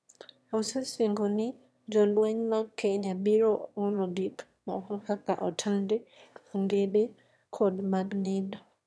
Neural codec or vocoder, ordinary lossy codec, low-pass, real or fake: autoencoder, 22.05 kHz, a latent of 192 numbers a frame, VITS, trained on one speaker; none; none; fake